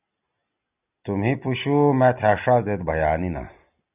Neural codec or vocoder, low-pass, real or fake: none; 3.6 kHz; real